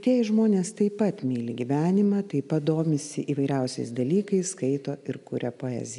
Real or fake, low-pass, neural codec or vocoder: real; 10.8 kHz; none